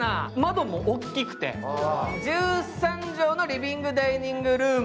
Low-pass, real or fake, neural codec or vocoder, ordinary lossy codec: none; real; none; none